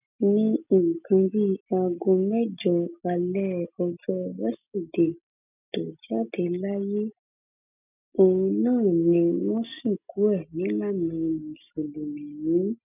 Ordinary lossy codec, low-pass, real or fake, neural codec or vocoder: none; 3.6 kHz; real; none